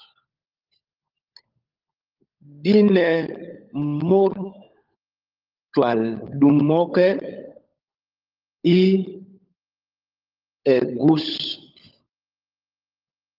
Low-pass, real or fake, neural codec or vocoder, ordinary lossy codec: 5.4 kHz; fake; codec, 16 kHz, 16 kbps, FunCodec, trained on LibriTTS, 50 frames a second; Opus, 32 kbps